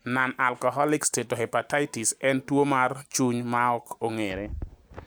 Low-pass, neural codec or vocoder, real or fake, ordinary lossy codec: none; none; real; none